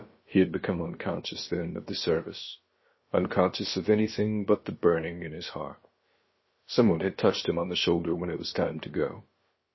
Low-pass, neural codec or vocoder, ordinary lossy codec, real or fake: 7.2 kHz; codec, 16 kHz, about 1 kbps, DyCAST, with the encoder's durations; MP3, 24 kbps; fake